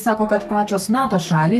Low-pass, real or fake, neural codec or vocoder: 14.4 kHz; fake; codec, 32 kHz, 1.9 kbps, SNAC